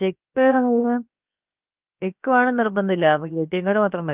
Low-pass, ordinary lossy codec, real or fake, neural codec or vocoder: 3.6 kHz; Opus, 24 kbps; fake; codec, 16 kHz, about 1 kbps, DyCAST, with the encoder's durations